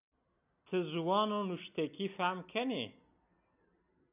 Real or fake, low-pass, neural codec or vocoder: real; 3.6 kHz; none